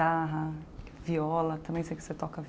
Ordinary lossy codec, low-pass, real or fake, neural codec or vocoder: none; none; real; none